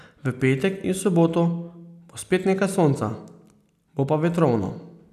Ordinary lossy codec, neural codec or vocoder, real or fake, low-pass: none; none; real; 14.4 kHz